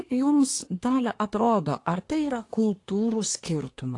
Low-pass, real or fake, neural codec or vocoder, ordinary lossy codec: 10.8 kHz; fake; codec, 24 kHz, 1 kbps, SNAC; AAC, 48 kbps